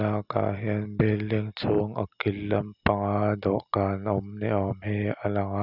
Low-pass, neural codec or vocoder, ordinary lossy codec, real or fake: 5.4 kHz; none; none; real